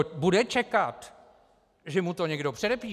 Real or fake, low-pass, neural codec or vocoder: real; 14.4 kHz; none